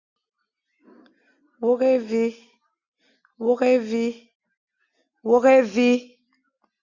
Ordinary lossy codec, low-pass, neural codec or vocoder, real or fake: Opus, 64 kbps; 7.2 kHz; none; real